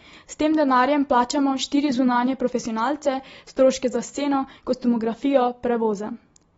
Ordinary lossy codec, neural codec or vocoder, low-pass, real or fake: AAC, 24 kbps; none; 19.8 kHz; real